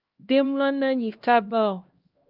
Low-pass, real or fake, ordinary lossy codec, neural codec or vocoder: 5.4 kHz; fake; Opus, 24 kbps; codec, 16 kHz, 1 kbps, X-Codec, HuBERT features, trained on LibriSpeech